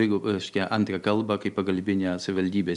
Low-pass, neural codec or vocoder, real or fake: 10.8 kHz; none; real